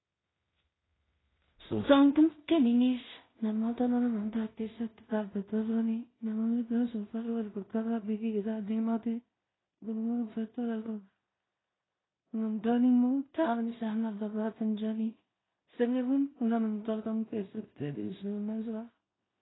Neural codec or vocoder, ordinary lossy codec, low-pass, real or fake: codec, 16 kHz in and 24 kHz out, 0.4 kbps, LongCat-Audio-Codec, two codebook decoder; AAC, 16 kbps; 7.2 kHz; fake